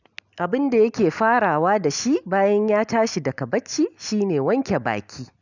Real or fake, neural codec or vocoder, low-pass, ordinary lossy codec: real; none; 7.2 kHz; none